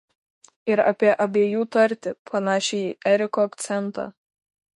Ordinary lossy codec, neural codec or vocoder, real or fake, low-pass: MP3, 48 kbps; autoencoder, 48 kHz, 32 numbers a frame, DAC-VAE, trained on Japanese speech; fake; 14.4 kHz